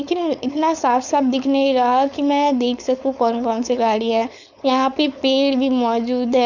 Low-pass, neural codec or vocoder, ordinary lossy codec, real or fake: 7.2 kHz; codec, 16 kHz, 4.8 kbps, FACodec; none; fake